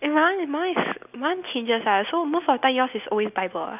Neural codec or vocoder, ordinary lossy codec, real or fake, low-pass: none; none; real; 3.6 kHz